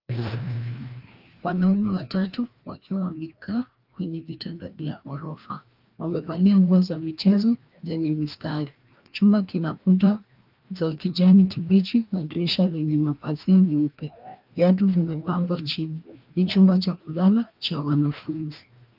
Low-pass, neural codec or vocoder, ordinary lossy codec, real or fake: 5.4 kHz; codec, 16 kHz, 1 kbps, FreqCodec, larger model; Opus, 24 kbps; fake